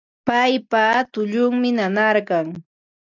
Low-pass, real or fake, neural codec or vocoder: 7.2 kHz; real; none